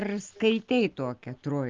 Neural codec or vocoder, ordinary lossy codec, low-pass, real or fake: none; Opus, 24 kbps; 7.2 kHz; real